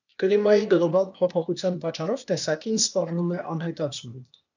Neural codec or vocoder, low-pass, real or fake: codec, 16 kHz, 0.8 kbps, ZipCodec; 7.2 kHz; fake